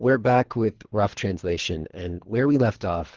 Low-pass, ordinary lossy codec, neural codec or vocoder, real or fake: 7.2 kHz; Opus, 16 kbps; codec, 24 kHz, 3 kbps, HILCodec; fake